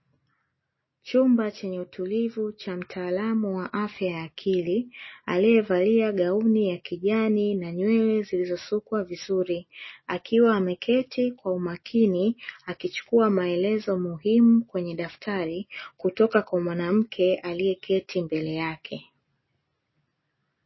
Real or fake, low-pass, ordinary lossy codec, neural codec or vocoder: real; 7.2 kHz; MP3, 24 kbps; none